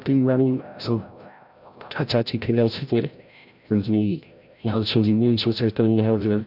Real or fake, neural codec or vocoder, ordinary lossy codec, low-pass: fake; codec, 16 kHz, 0.5 kbps, FreqCodec, larger model; none; 5.4 kHz